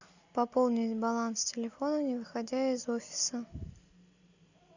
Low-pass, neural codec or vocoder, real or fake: 7.2 kHz; none; real